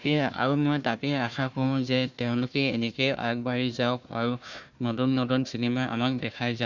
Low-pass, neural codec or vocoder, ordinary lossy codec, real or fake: 7.2 kHz; codec, 16 kHz, 1 kbps, FunCodec, trained on Chinese and English, 50 frames a second; none; fake